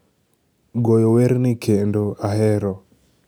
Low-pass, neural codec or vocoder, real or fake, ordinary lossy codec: none; none; real; none